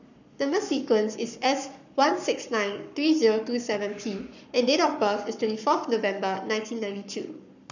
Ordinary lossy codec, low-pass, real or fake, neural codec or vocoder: none; 7.2 kHz; fake; codec, 44.1 kHz, 7.8 kbps, Pupu-Codec